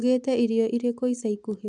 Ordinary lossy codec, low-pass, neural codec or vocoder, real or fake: none; 10.8 kHz; none; real